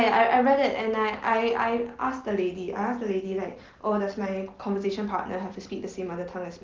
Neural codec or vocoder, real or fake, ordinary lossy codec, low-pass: none; real; Opus, 16 kbps; 7.2 kHz